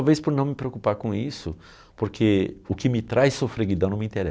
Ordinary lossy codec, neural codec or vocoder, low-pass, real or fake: none; none; none; real